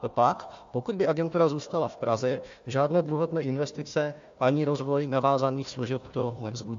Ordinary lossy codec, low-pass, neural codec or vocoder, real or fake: AAC, 64 kbps; 7.2 kHz; codec, 16 kHz, 1 kbps, FunCodec, trained on Chinese and English, 50 frames a second; fake